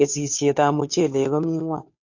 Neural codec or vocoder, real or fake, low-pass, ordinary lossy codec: codec, 16 kHz, 2 kbps, FunCodec, trained on Chinese and English, 25 frames a second; fake; 7.2 kHz; MP3, 48 kbps